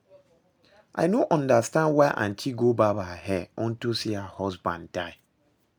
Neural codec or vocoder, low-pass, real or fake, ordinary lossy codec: none; none; real; none